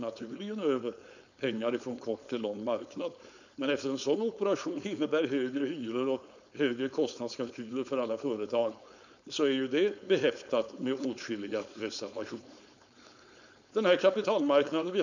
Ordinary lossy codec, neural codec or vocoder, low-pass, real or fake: none; codec, 16 kHz, 4.8 kbps, FACodec; 7.2 kHz; fake